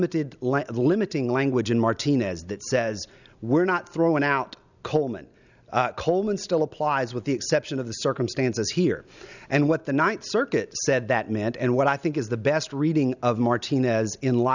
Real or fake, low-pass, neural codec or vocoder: real; 7.2 kHz; none